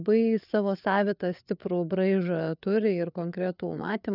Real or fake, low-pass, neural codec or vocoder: fake; 5.4 kHz; codec, 16 kHz, 4 kbps, FreqCodec, larger model